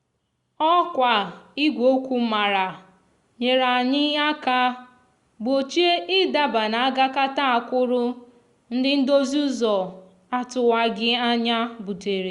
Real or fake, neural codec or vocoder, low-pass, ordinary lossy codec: real; none; 10.8 kHz; Opus, 64 kbps